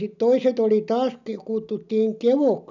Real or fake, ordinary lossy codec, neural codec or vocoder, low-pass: real; none; none; 7.2 kHz